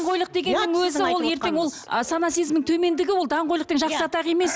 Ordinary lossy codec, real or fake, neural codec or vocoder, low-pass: none; real; none; none